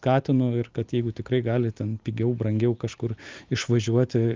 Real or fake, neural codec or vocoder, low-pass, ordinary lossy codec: real; none; 7.2 kHz; Opus, 32 kbps